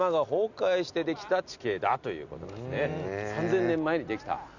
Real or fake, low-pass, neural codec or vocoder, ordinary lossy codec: real; 7.2 kHz; none; none